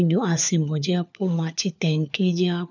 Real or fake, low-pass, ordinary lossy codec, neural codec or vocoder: fake; 7.2 kHz; none; codec, 16 kHz, 4 kbps, FunCodec, trained on LibriTTS, 50 frames a second